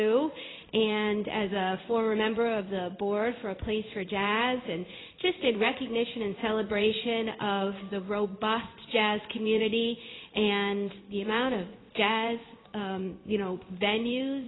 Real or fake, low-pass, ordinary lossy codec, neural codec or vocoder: fake; 7.2 kHz; AAC, 16 kbps; codec, 16 kHz in and 24 kHz out, 1 kbps, XY-Tokenizer